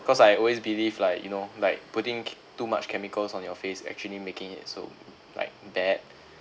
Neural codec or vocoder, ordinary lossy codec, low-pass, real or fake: none; none; none; real